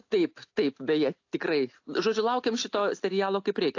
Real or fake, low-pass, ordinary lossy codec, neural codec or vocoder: real; 7.2 kHz; AAC, 48 kbps; none